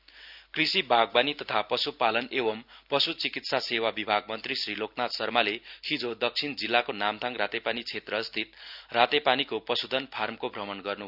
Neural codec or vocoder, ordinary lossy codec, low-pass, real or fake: none; none; 5.4 kHz; real